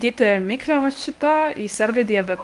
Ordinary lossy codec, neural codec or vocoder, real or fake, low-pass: Opus, 32 kbps; codec, 24 kHz, 0.9 kbps, WavTokenizer, medium speech release version 1; fake; 10.8 kHz